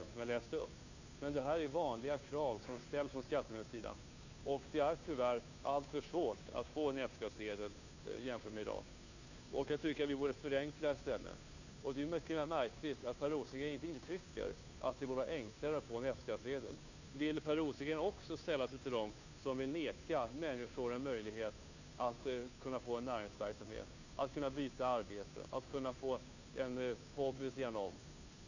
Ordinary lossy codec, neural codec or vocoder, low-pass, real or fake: none; codec, 16 kHz, 2 kbps, FunCodec, trained on Chinese and English, 25 frames a second; 7.2 kHz; fake